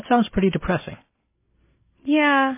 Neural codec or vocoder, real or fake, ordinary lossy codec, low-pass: none; real; MP3, 16 kbps; 3.6 kHz